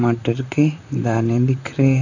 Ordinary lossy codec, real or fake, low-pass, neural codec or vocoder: none; fake; 7.2 kHz; vocoder, 44.1 kHz, 128 mel bands, Pupu-Vocoder